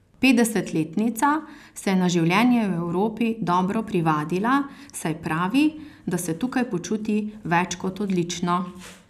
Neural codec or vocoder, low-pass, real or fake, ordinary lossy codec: none; 14.4 kHz; real; none